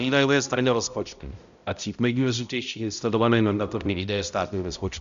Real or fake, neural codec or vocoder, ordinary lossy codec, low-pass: fake; codec, 16 kHz, 0.5 kbps, X-Codec, HuBERT features, trained on balanced general audio; Opus, 64 kbps; 7.2 kHz